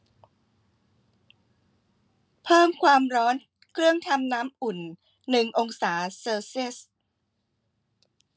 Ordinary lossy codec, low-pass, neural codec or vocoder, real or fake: none; none; none; real